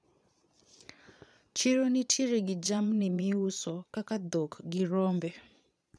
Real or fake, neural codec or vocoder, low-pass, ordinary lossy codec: fake; vocoder, 22.05 kHz, 80 mel bands, Vocos; none; none